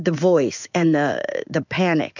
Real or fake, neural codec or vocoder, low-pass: fake; codec, 16 kHz in and 24 kHz out, 1 kbps, XY-Tokenizer; 7.2 kHz